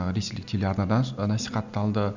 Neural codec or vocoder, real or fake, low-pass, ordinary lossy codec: none; real; 7.2 kHz; none